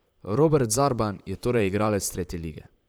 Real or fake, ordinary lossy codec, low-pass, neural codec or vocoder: fake; none; none; vocoder, 44.1 kHz, 128 mel bands, Pupu-Vocoder